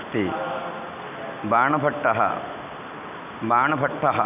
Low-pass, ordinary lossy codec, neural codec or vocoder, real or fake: 3.6 kHz; none; none; real